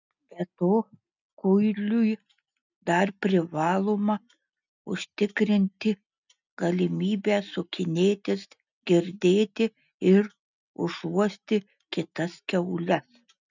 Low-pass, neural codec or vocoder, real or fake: 7.2 kHz; none; real